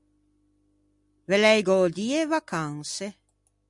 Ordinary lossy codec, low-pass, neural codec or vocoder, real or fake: MP3, 96 kbps; 10.8 kHz; none; real